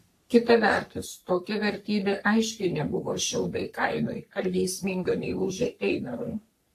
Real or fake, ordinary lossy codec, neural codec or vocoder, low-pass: fake; AAC, 64 kbps; codec, 44.1 kHz, 3.4 kbps, Pupu-Codec; 14.4 kHz